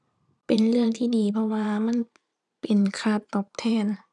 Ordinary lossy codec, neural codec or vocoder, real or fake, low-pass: none; vocoder, 44.1 kHz, 128 mel bands every 512 samples, BigVGAN v2; fake; 10.8 kHz